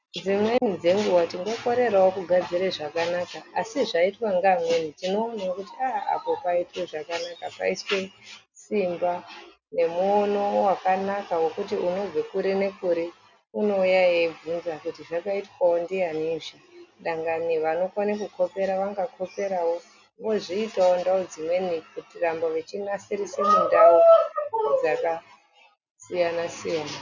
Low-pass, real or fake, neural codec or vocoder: 7.2 kHz; real; none